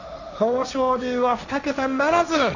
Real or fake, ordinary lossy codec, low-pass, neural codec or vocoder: fake; none; 7.2 kHz; codec, 16 kHz, 1.1 kbps, Voila-Tokenizer